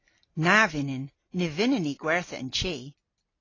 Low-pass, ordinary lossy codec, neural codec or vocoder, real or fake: 7.2 kHz; AAC, 32 kbps; none; real